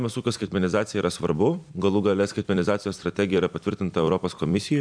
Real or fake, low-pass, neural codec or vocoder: fake; 9.9 kHz; vocoder, 44.1 kHz, 128 mel bands every 256 samples, BigVGAN v2